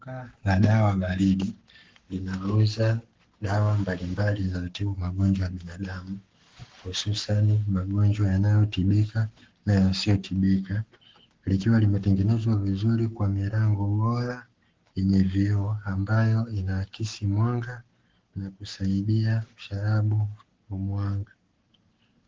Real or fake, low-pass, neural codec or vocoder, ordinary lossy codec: fake; 7.2 kHz; codec, 44.1 kHz, 7.8 kbps, Pupu-Codec; Opus, 16 kbps